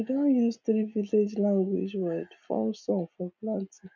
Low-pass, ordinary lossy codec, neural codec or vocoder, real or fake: 7.2 kHz; MP3, 64 kbps; none; real